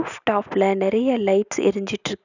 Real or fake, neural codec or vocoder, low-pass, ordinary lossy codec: real; none; 7.2 kHz; none